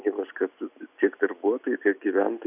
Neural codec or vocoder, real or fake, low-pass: none; real; 3.6 kHz